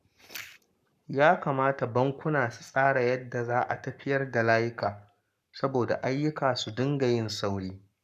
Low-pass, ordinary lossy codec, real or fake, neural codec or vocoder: 14.4 kHz; none; fake; codec, 44.1 kHz, 7.8 kbps, Pupu-Codec